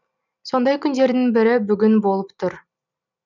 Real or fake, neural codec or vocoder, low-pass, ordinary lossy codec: real; none; 7.2 kHz; none